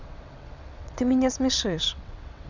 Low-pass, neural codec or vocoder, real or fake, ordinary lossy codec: 7.2 kHz; vocoder, 22.05 kHz, 80 mel bands, WaveNeXt; fake; none